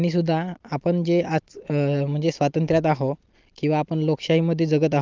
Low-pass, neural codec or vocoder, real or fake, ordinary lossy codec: 7.2 kHz; none; real; Opus, 24 kbps